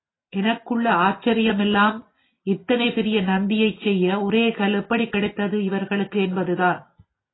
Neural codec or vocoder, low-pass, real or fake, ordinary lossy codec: none; 7.2 kHz; real; AAC, 16 kbps